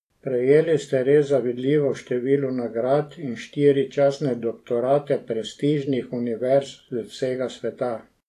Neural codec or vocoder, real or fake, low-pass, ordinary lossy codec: vocoder, 44.1 kHz, 128 mel bands every 512 samples, BigVGAN v2; fake; 14.4 kHz; AAC, 64 kbps